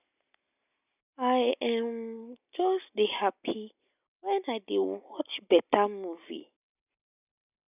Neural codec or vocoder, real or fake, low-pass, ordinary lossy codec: none; real; 3.6 kHz; none